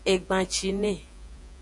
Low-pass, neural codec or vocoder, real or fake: 10.8 kHz; vocoder, 48 kHz, 128 mel bands, Vocos; fake